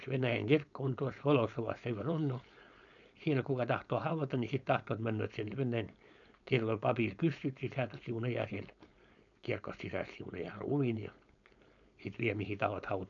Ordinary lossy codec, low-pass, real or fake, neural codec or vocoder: none; 7.2 kHz; fake; codec, 16 kHz, 4.8 kbps, FACodec